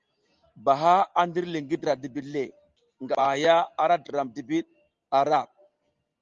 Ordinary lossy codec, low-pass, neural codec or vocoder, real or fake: Opus, 32 kbps; 7.2 kHz; none; real